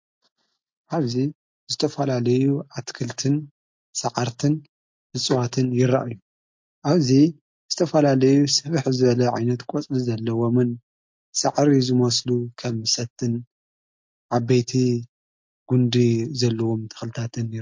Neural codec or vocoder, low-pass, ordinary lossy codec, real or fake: none; 7.2 kHz; MP3, 48 kbps; real